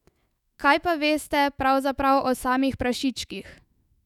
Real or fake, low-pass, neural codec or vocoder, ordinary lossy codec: fake; 19.8 kHz; autoencoder, 48 kHz, 128 numbers a frame, DAC-VAE, trained on Japanese speech; none